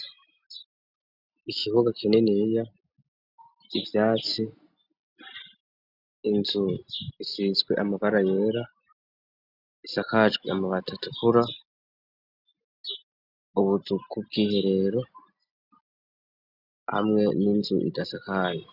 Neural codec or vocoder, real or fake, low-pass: none; real; 5.4 kHz